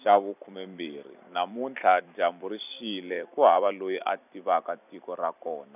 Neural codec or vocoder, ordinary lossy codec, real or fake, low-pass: vocoder, 44.1 kHz, 128 mel bands every 256 samples, BigVGAN v2; none; fake; 3.6 kHz